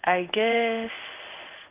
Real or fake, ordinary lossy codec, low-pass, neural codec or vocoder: fake; Opus, 32 kbps; 3.6 kHz; codec, 16 kHz in and 24 kHz out, 1 kbps, XY-Tokenizer